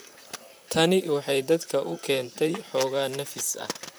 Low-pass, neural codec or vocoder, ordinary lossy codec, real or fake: none; vocoder, 44.1 kHz, 128 mel bands, Pupu-Vocoder; none; fake